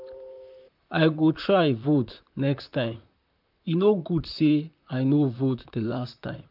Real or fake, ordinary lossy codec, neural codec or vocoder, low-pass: fake; none; vocoder, 44.1 kHz, 128 mel bands, Pupu-Vocoder; 5.4 kHz